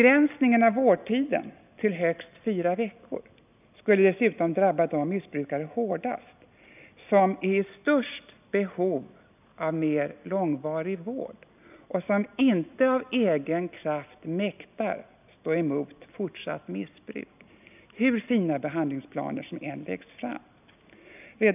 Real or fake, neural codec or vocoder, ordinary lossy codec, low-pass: real; none; none; 3.6 kHz